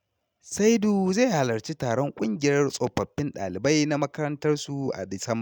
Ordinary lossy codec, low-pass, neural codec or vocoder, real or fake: none; 19.8 kHz; none; real